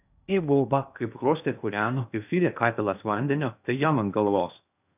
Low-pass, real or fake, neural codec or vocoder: 3.6 kHz; fake; codec, 16 kHz in and 24 kHz out, 0.6 kbps, FocalCodec, streaming, 2048 codes